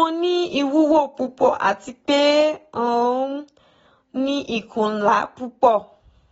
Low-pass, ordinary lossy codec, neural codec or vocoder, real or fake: 10.8 kHz; AAC, 24 kbps; none; real